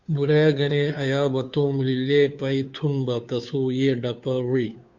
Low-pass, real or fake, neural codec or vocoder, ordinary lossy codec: 7.2 kHz; fake; codec, 16 kHz, 2 kbps, FunCodec, trained on Chinese and English, 25 frames a second; Opus, 64 kbps